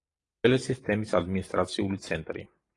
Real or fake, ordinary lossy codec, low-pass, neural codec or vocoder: fake; AAC, 32 kbps; 10.8 kHz; vocoder, 44.1 kHz, 128 mel bands every 512 samples, BigVGAN v2